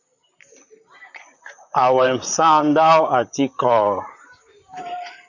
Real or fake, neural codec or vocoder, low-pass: fake; vocoder, 44.1 kHz, 128 mel bands, Pupu-Vocoder; 7.2 kHz